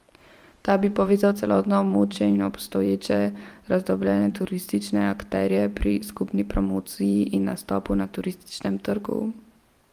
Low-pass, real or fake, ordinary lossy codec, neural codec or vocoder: 14.4 kHz; fake; Opus, 32 kbps; vocoder, 44.1 kHz, 128 mel bands every 512 samples, BigVGAN v2